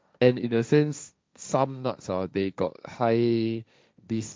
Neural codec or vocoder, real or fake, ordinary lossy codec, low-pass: codec, 16 kHz, 1.1 kbps, Voila-Tokenizer; fake; none; none